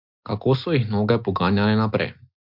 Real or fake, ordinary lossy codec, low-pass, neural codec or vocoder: real; MP3, 48 kbps; 5.4 kHz; none